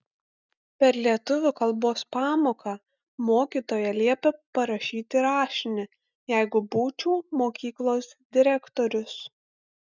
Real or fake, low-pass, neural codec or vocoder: real; 7.2 kHz; none